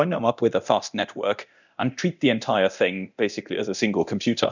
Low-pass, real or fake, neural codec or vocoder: 7.2 kHz; fake; codec, 24 kHz, 0.9 kbps, DualCodec